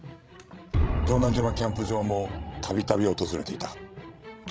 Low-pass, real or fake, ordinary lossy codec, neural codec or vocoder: none; fake; none; codec, 16 kHz, 16 kbps, FreqCodec, larger model